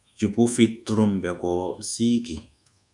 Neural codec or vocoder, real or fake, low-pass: codec, 24 kHz, 1.2 kbps, DualCodec; fake; 10.8 kHz